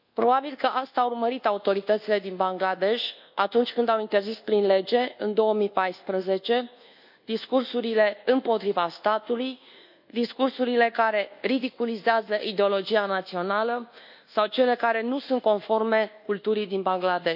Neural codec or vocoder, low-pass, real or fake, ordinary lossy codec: codec, 24 kHz, 1.2 kbps, DualCodec; 5.4 kHz; fake; none